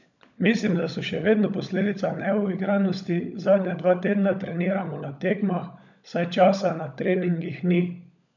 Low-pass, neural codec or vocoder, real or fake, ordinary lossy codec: 7.2 kHz; codec, 16 kHz, 16 kbps, FunCodec, trained on LibriTTS, 50 frames a second; fake; none